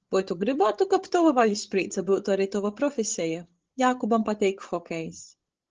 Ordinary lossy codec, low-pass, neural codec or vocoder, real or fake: Opus, 16 kbps; 7.2 kHz; codec, 16 kHz, 16 kbps, FreqCodec, larger model; fake